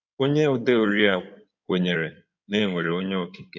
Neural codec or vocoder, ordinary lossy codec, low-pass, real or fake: codec, 16 kHz in and 24 kHz out, 2.2 kbps, FireRedTTS-2 codec; none; 7.2 kHz; fake